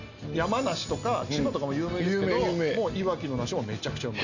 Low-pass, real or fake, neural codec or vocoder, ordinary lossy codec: 7.2 kHz; real; none; none